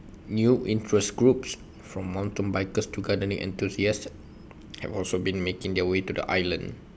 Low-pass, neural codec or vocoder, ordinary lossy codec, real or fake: none; none; none; real